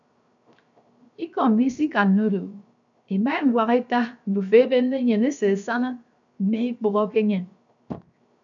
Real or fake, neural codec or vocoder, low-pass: fake; codec, 16 kHz, 0.7 kbps, FocalCodec; 7.2 kHz